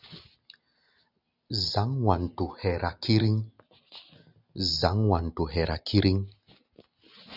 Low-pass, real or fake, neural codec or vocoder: 5.4 kHz; real; none